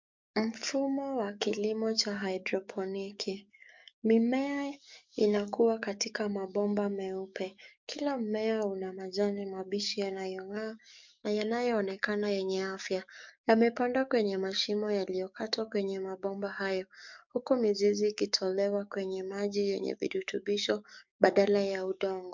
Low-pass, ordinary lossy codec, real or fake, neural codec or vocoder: 7.2 kHz; MP3, 64 kbps; fake; codec, 44.1 kHz, 7.8 kbps, DAC